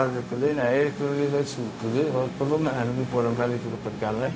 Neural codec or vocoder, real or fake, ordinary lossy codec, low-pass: codec, 16 kHz, 0.4 kbps, LongCat-Audio-Codec; fake; none; none